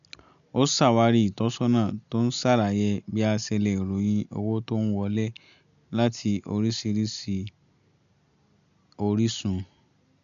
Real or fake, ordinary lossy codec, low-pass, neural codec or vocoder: real; none; 7.2 kHz; none